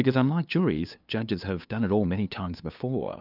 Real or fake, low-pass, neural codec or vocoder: fake; 5.4 kHz; codec, 16 kHz, 2 kbps, FunCodec, trained on LibriTTS, 25 frames a second